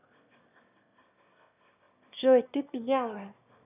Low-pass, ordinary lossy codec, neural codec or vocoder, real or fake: 3.6 kHz; none; autoencoder, 22.05 kHz, a latent of 192 numbers a frame, VITS, trained on one speaker; fake